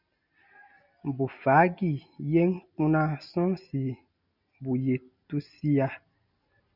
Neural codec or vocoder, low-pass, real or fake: none; 5.4 kHz; real